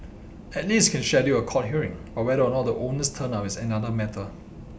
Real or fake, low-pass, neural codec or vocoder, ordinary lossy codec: real; none; none; none